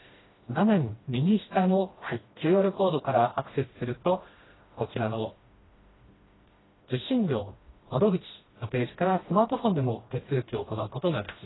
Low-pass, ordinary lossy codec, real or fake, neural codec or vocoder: 7.2 kHz; AAC, 16 kbps; fake; codec, 16 kHz, 1 kbps, FreqCodec, smaller model